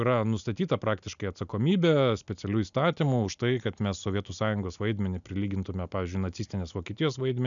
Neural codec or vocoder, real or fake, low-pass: none; real; 7.2 kHz